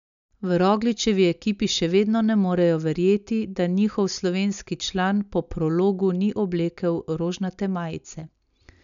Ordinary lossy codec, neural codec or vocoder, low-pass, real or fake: none; none; 7.2 kHz; real